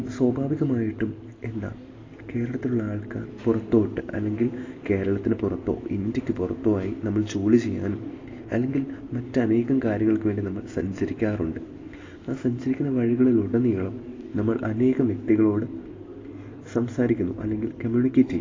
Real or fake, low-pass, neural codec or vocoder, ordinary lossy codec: real; 7.2 kHz; none; AAC, 32 kbps